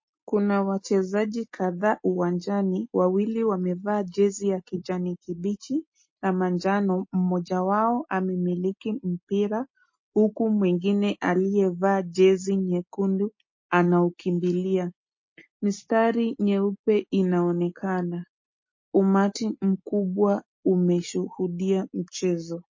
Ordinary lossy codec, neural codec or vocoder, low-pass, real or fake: MP3, 32 kbps; none; 7.2 kHz; real